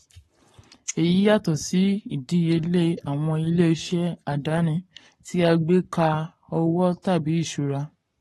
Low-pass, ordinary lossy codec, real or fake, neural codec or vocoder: 19.8 kHz; AAC, 32 kbps; fake; codec, 44.1 kHz, 7.8 kbps, Pupu-Codec